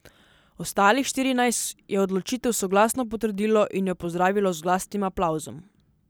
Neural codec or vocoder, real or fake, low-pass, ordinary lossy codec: none; real; none; none